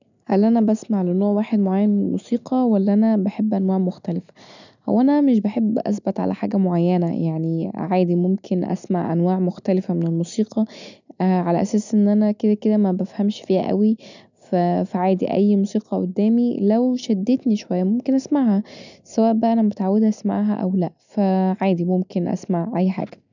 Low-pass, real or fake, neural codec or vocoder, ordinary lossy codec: 7.2 kHz; real; none; none